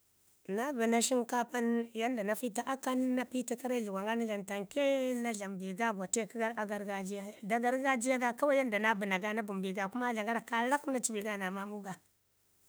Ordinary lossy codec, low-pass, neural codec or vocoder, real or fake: none; none; autoencoder, 48 kHz, 32 numbers a frame, DAC-VAE, trained on Japanese speech; fake